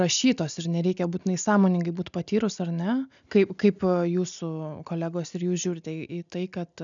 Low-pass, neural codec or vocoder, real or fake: 7.2 kHz; none; real